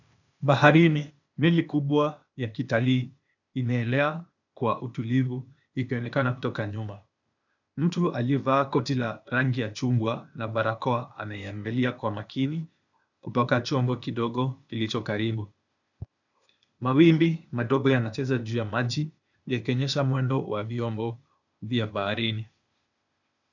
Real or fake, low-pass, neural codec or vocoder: fake; 7.2 kHz; codec, 16 kHz, 0.8 kbps, ZipCodec